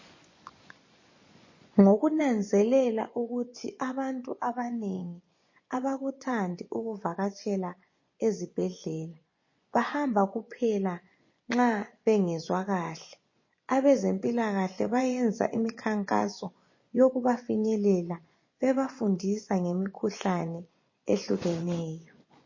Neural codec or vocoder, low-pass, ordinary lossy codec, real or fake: vocoder, 24 kHz, 100 mel bands, Vocos; 7.2 kHz; MP3, 32 kbps; fake